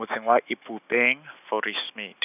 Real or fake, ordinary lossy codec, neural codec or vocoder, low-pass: real; none; none; 3.6 kHz